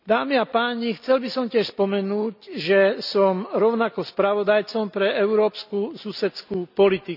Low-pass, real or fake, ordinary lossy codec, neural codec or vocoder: 5.4 kHz; real; none; none